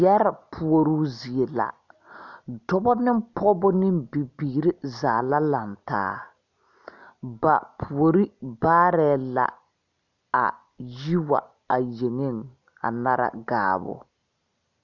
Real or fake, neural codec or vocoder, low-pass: real; none; 7.2 kHz